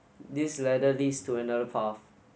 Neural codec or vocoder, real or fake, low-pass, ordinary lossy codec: none; real; none; none